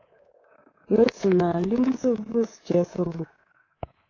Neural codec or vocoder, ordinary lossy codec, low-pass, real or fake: codec, 24 kHz, 3.1 kbps, DualCodec; AAC, 32 kbps; 7.2 kHz; fake